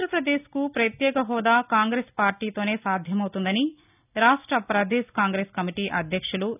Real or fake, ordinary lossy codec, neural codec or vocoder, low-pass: real; none; none; 3.6 kHz